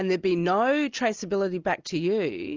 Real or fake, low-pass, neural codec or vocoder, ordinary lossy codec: real; 7.2 kHz; none; Opus, 32 kbps